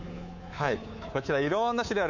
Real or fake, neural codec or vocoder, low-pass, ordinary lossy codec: fake; codec, 24 kHz, 3.1 kbps, DualCodec; 7.2 kHz; none